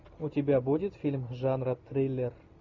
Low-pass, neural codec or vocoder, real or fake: 7.2 kHz; none; real